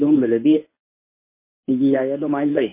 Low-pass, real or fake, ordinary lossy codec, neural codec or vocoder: 3.6 kHz; fake; MP3, 24 kbps; codec, 24 kHz, 0.9 kbps, WavTokenizer, medium speech release version 1